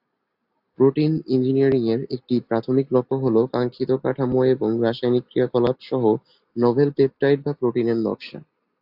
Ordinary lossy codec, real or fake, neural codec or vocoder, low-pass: AAC, 48 kbps; real; none; 5.4 kHz